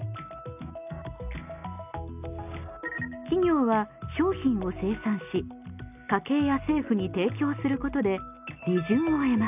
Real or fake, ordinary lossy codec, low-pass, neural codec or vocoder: real; none; 3.6 kHz; none